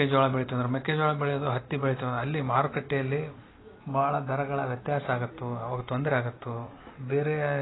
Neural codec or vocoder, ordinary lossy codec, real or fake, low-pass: none; AAC, 16 kbps; real; 7.2 kHz